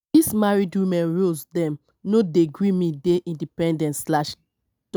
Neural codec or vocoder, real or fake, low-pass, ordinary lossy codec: none; real; none; none